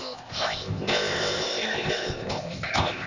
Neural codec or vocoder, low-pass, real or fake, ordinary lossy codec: codec, 16 kHz, 0.8 kbps, ZipCodec; 7.2 kHz; fake; none